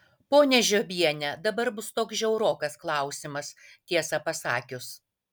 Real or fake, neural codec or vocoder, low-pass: real; none; 19.8 kHz